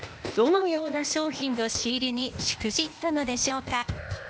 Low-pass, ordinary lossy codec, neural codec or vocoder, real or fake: none; none; codec, 16 kHz, 0.8 kbps, ZipCodec; fake